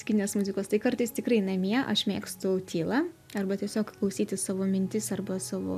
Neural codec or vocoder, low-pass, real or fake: none; 14.4 kHz; real